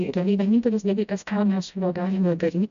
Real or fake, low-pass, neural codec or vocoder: fake; 7.2 kHz; codec, 16 kHz, 0.5 kbps, FreqCodec, smaller model